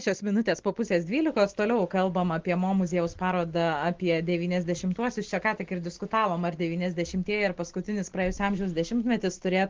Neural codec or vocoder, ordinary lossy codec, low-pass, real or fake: none; Opus, 16 kbps; 7.2 kHz; real